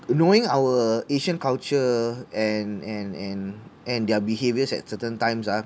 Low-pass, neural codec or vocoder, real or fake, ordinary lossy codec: none; none; real; none